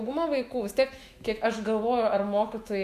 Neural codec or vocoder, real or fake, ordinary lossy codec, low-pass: autoencoder, 48 kHz, 128 numbers a frame, DAC-VAE, trained on Japanese speech; fake; Opus, 64 kbps; 14.4 kHz